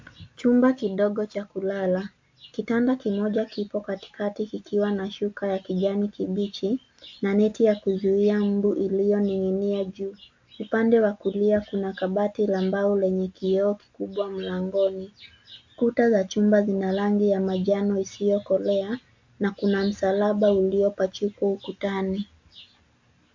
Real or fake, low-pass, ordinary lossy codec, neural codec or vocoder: real; 7.2 kHz; MP3, 48 kbps; none